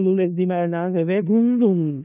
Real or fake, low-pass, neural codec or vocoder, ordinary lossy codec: fake; 3.6 kHz; codec, 16 kHz in and 24 kHz out, 0.4 kbps, LongCat-Audio-Codec, four codebook decoder; none